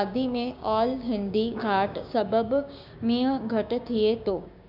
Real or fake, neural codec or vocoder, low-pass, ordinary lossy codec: fake; codec, 16 kHz, 6 kbps, DAC; 5.4 kHz; none